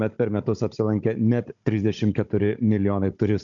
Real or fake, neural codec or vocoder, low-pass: fake; codec, 16 kHz, 16 kbps, FunCodec, trained on Chinese and English, 50 frames a second; 7.2 kHz